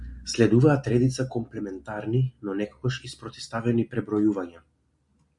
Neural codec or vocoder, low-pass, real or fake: none; 10.8 kHz; real